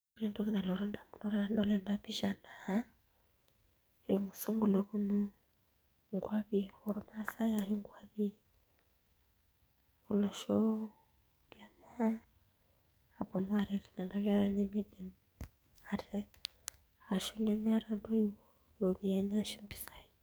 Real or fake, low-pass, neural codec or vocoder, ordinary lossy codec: fake; none; codec, 44.1 kHz, 2.6 kbps, SNAC; none